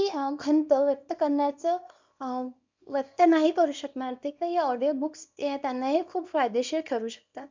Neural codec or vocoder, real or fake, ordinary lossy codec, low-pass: codec, 24 kHz, 0.9 kbps, WavTokenizer, small release; fake; MP3, 64 kbps; 7.2 kHz